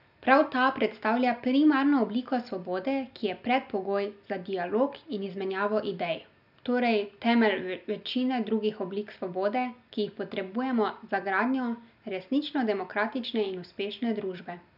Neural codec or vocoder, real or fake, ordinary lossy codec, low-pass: none; real; none; 5.4 kHz